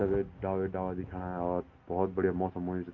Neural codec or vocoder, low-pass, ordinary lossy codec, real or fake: none; 7.2 kHz; Opus, 32 kbps; real